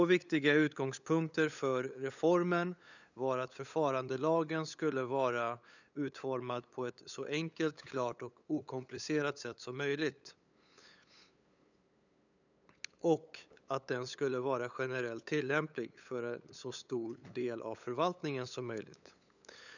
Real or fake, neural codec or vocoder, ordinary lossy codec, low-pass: fake; codec, 16 kHz, 8 kbps, FunCodec, trained on LibriTTS, 25 frames a second; none; 7.2 kHz